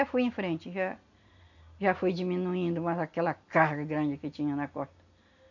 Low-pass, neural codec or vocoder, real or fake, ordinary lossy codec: 7.2 kHz; none; real; none